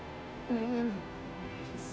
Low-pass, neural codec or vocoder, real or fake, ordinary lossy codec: none; codec, 16 kHz, 0.5 kbps, FunCodec, trained on Chinese and English, 25 frames a second; fake; none